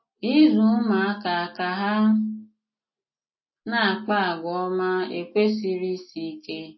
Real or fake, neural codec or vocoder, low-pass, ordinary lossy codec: real; none; 7.2 kHz; MP3, 24 kbps